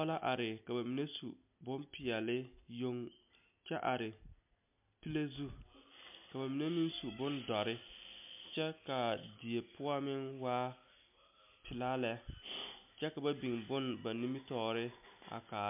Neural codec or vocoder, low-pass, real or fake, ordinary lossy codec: none; 3.6 kHz; real; MP3, 32 kbps